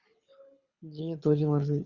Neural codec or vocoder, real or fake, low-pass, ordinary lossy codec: codec, 24 kHz, 6 kbps, HILCodec; fake; 7.2 kHz; Opus, 64 kbps